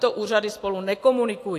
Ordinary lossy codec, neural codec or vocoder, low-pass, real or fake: AAC, 64 kbps; none; 14.4 kHz; real